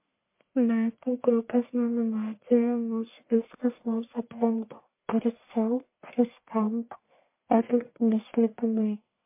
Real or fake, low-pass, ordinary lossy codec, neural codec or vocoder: fake; 3.6 kHz; MP3, 24 kbps; codec, 44.1 kHz, 1.7 kbps, Pupu-Codec